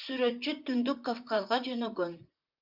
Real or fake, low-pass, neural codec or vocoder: fake; 5.4 kHz; vocoder, 44.1 kHz, 128 mel bands, Pupu-Vocoder